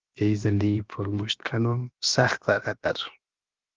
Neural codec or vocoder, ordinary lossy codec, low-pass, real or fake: codec, 16 kHz, 0.7 kbps, FocalCodec; Opus, 24 kbps; 7.2 kHz; fake